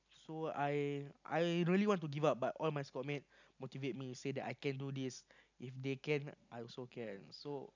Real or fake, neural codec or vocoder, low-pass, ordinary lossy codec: real; none; 7.2 kHz; none